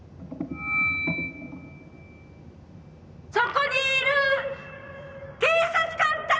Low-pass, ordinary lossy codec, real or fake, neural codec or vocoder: none; none; real; none